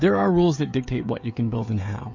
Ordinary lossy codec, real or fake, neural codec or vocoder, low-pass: MP3, 48 kbps; fake; codec, 16 kHz, 16 kbps, FreqCodec, smaller model; 7.2 kHz